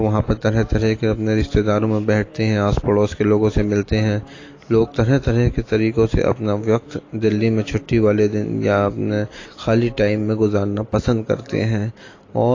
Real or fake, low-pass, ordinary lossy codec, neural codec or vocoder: real; 7.2 kHz; AAC, 32 kbps; none